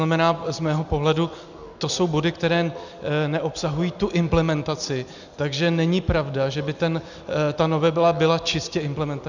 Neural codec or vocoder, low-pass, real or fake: none; 7.2 kHz; real